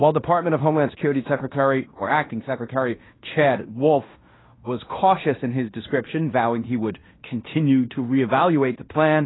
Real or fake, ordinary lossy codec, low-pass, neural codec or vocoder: fake; AAC, 16 kbps; 7.2 kHz; codec, 16 kHz in and 24 kHz out, 0.9 kbps, LongCat-Audio-Codec, fine tuned four codebook decoder